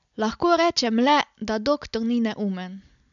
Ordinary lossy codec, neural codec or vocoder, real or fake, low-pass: none; none; real; 7.2 kHz